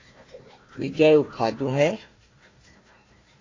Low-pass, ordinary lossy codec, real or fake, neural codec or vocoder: 7.2 kHz; AAC, 32 kbps; fake; codec, 16 kHz, 1 kbps, FunCodec, trained on Chinese and English, 50 frames a second